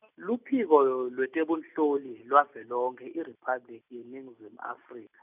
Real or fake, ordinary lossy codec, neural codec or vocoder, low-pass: real; none; none; 3.6 kHz